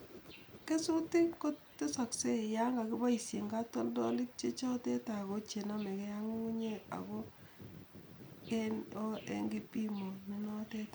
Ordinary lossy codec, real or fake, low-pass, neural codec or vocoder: none; real; none; none